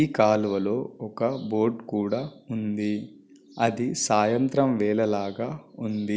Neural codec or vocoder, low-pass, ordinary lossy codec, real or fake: none; none; none; real